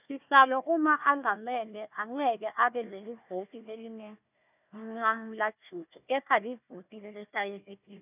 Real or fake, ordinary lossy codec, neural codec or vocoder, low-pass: fake; none; codec, 16 kHz, 1 kbps, FunCodec, trained on Chinese and English, 50 frames a second; 3.6 kHz